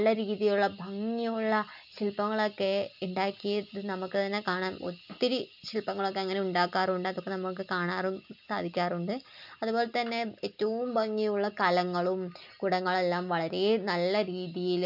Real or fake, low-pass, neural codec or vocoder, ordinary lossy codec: real; 5.4 kHz; none; none